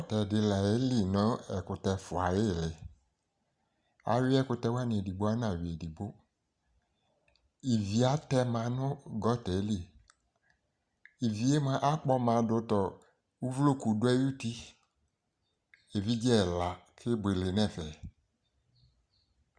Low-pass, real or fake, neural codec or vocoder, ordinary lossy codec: 9.9 kHz; real; none; Opus, 64 kbps